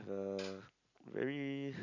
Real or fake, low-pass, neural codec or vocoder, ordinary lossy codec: real; 7.2 kHz; none; none